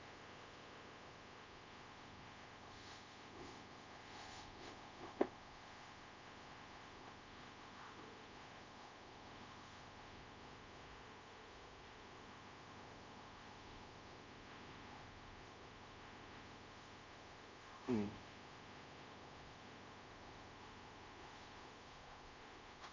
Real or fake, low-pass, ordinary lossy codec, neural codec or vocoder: fake; 7.2 kHz; MP3, 48 kbps; codec, 24 kHz, 0.5 kbps, DualCodec